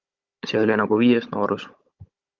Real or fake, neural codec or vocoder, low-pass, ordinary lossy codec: fake; codec, 16 kHz, 16 kbps, FunCodec, trained on Chinese and English, 50 frames a second; 7.2 kHz; Opus, 32 kbps